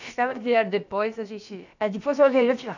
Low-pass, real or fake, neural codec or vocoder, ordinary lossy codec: 7.2 kHz; fake; codec, 16 kHz, about 1 kbps, DyCAST, with the encoder's durations; none